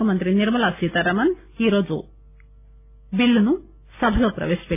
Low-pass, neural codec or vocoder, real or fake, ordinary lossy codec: 3.6 kHz; none; real; AAC, 32 kbps